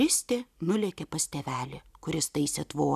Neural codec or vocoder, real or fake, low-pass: none; real; 14.4 kHz